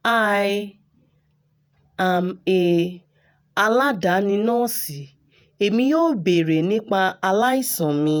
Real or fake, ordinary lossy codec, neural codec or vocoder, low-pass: fake; none; vocoder, 48 kHz, 128 mel bands, Vocos; none